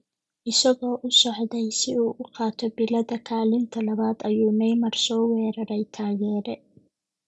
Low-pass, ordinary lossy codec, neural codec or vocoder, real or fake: none; none; none; real